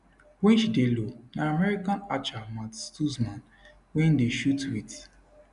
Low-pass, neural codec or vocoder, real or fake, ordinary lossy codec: 10.8 kHz; none; real; none